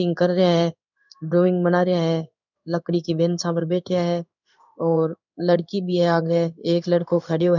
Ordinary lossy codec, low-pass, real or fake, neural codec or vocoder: none; 7.2 kHz; fake; codec, 16 kHz in and 24 kHz out, 1 kbps, XY-Tokenizer